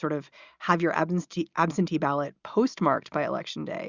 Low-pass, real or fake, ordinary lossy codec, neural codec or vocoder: 7.2 kHz; real; Opus, 64 kbps; none